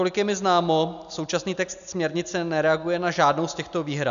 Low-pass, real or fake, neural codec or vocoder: 7.2 kHz; real; none